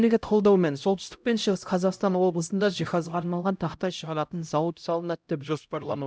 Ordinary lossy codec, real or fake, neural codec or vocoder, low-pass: none; fake; codec, 16 kHz, 0.5 kbps, X-Codec, HuBERT features, trained on LibriSpeech; none